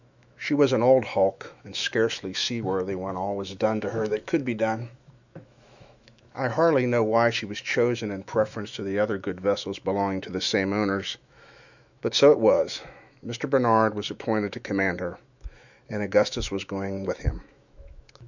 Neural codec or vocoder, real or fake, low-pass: autoencoder, 48 kHz, 128 numbers a frame, DAC-VAE, trained on Japanese speech; fake; 7.2 kHz